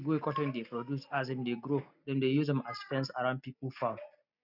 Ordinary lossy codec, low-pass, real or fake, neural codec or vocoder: none; 5.4 kHz; real; none